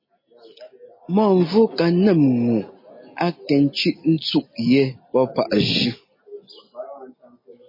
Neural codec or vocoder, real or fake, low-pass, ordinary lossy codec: none; real; 5.4 kHz; MP3, 24 kbps